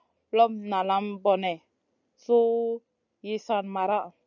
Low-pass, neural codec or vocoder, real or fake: 7.2 kHz; none; real